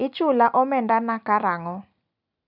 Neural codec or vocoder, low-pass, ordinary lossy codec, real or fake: none; 5.4 kHz; none; real